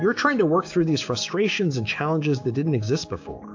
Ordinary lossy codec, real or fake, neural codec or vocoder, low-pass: AAC, 48 kbps; fake; codec, 16 kHz, 6 kbps, DAC; 7.2 kHz